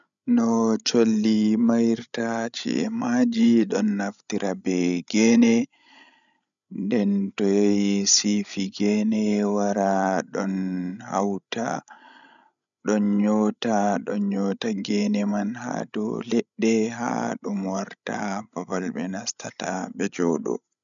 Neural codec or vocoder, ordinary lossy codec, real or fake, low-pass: codec, 16 kHz, 16 kbps, FreqCodec, larger model; none; fake; 7.2 kHz